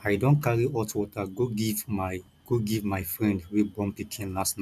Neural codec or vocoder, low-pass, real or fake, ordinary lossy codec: none; 14.4 kHz; real; none